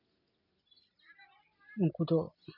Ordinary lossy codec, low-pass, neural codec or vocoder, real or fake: none; 5.4 kHz; none; real